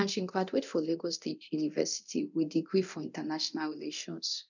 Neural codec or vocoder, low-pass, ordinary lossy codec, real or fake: codec, 24 kHz, 0.9 kbps, DualCodec; 7.2 kHz; none; fake